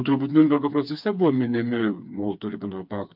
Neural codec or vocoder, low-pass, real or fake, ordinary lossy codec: codec, 16 kHz, 4 kbps, FreqCodec, smaller model; 5.4 kHz; fake; MP3, 48 kbps